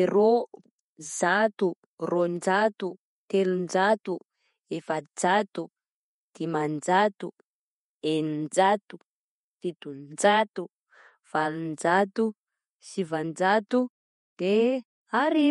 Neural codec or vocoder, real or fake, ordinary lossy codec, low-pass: vocoder, 48 kHz, 128 mel bands, Vocos; fake; MP3, 48 kbps; 19.8 kHz